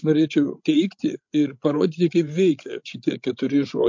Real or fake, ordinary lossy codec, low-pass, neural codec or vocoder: fake; MP3, 48 kbps; 7.2 kHz; codec, 16 kHz, 16 kbps, FreqCodec, larger model